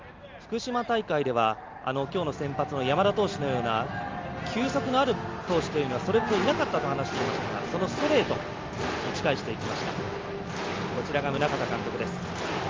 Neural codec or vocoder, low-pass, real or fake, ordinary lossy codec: none; 7.2 kHz; real; Opus, 32 kbps